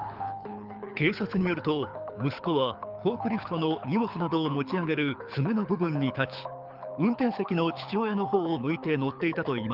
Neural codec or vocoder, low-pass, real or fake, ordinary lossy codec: codec, 24 kHz, 6 kbps, HILCodec; 5.4 kHz; fake; Opus, 24 kbps